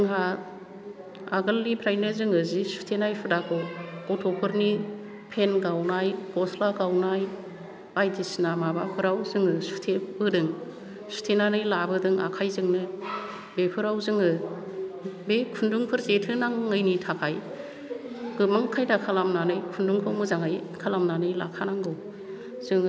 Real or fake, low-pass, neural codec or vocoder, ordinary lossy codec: real; none; none; none